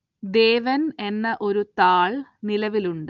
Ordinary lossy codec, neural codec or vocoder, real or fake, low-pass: Opus, 32 kbps; none; real; 7.2 kHz